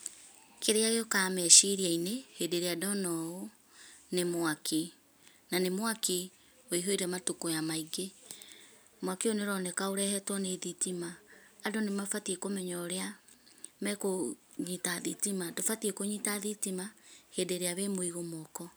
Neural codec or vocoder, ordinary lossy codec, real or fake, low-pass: none; none; real; none